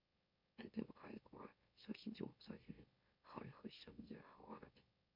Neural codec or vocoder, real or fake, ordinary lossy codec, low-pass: autoencoder, 44.1 kHz, a latent of 192 numbers a frame, MeloTTS; fake; MP3, 48 kbps; 5.4 kHz